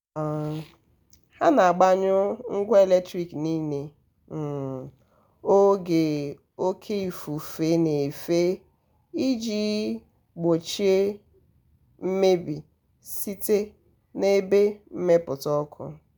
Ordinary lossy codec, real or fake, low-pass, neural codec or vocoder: none; real; none; none